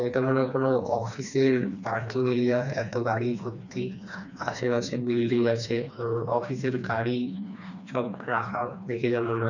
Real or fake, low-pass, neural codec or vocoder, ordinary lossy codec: fake; 7.2 kHz; codec, 16 kHz, 2 kbps, FreqCodec, smaller model; none